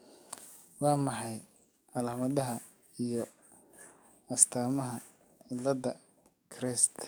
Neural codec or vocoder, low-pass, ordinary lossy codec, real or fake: codec, 44.1 kHz, 7.8 kbps, DAC; none; none; fake